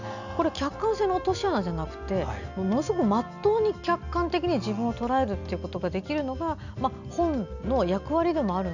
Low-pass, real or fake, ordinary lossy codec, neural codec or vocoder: 7.2 kHz; real; none; none